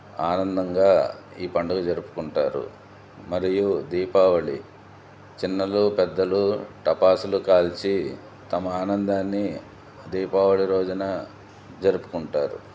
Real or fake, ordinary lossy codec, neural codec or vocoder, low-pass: real; none; none; none